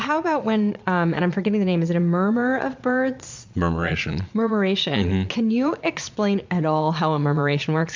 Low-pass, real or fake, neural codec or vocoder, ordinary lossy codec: 7.2 kHz; fake; vocoder, 22.05 kHz, 80 mel bands, Vocos; MP3, 64 kbps